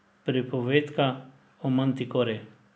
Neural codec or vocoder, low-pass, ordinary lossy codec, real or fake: none; none; none; real